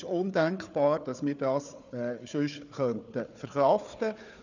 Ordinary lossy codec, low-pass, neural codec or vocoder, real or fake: none; 7.2 kHz; codec, 16 kHz, 4 kbps, FunCodec, trained on Chinese and English, 50 frames a second; fake